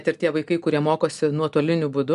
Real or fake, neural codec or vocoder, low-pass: real; none; 10.8 kHz